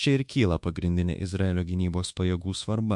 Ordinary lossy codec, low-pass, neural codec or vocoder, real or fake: MP3, 64 kbps; 10.8 kHz; codec, 24 kHz, 1.2 kbps, DualCodec; fake